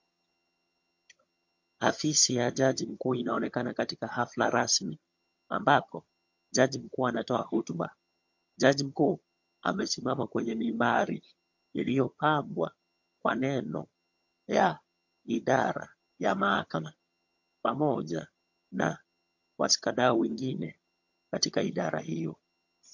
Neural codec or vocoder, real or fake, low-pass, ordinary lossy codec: vocoder, 22.05 kHz, 80 mel bands, HiFi-GAN; fake; 7.2 kHz; MP3, 48 kbps